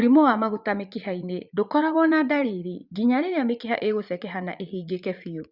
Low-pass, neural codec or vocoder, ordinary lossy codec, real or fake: 5.4 kHz; vocoder, 44.1 kHz, 80 mel bands, Vocos; Opus, 64 kbps; fake